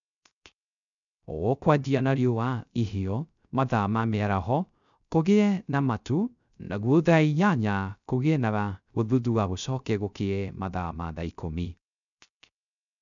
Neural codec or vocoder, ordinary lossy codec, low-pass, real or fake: codec, 16 kHz, 0.3 kbps, FocalCodec; none; 7.2 kHz; fake